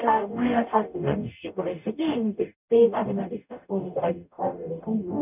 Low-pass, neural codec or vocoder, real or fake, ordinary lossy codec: 3.6 kHz; codec, 44.1 kHz, 0.9 kbps, DAC; fake; none